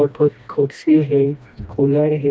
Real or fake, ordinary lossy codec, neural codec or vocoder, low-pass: fake; none; codec, 16 kHz, 1 kbps, FreqCodec, smaller model; none